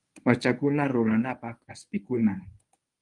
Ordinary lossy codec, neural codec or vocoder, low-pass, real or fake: Opus, 32 kbps; codec, 24 kHz, 0.9 kbps, WavTokenizer, medium speech release version 1; 10.8 kHz; fake